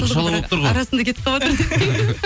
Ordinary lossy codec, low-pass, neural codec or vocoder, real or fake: none; none; none; real